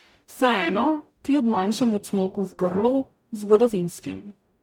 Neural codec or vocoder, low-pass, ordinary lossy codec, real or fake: codec, 44.1 kHz, 0.9 kbps, DAC; 19.8 kHz; MP3, 96 kbps; fake